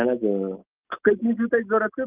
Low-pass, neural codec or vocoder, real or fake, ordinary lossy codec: 3.6 kHz; none; real; Opus, 24 kbps